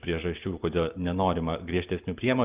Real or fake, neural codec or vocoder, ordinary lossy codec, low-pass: real; none; Opus, 24 kbps; 3.6 kHz